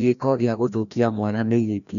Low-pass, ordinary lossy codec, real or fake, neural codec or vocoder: 7.2 kHz; none; fake; codec, 16 kHz, 1 kbps, FreqCodec, larger model